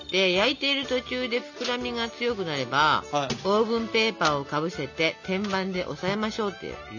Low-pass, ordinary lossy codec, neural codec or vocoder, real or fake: 7.2 kHz; none; none; real